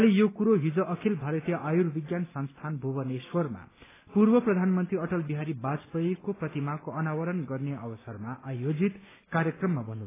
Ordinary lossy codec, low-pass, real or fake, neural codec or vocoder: AAC, 16 kbps; 3.6 kHz; real; none